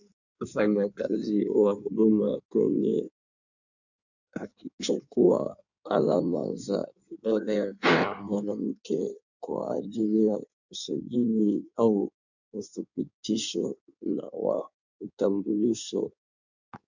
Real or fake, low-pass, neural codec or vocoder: fake; 7.2 kHz; codec, 16 kHz in and 24 kHz out, 1.1 kbps, FireRedTTS-2 codec